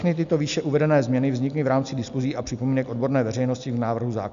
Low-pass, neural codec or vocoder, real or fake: 7.2 kHz; none; real